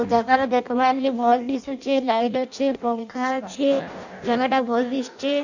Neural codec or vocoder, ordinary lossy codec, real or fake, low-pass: codec, 16 kHz in and 24 kHz out, 0.6 kbps, FireRedTTS-2 codec; none; fake; 7.2 kHz